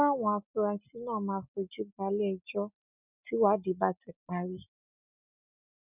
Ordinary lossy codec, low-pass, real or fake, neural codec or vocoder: none; 3.6 kHz; real; none